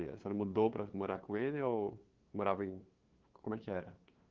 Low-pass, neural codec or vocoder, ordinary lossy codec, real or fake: 7.2 kHz; codec, 16 kHz, 2 kbps, FunCodec, trained on LibriTTS, 25 frames a second; Opus, 16 kbps; fake